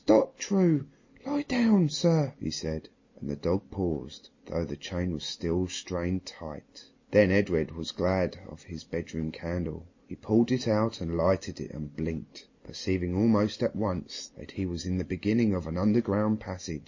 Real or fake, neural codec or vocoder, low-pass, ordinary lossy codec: real; none; 7.2 kHz; MP3, 32 kbps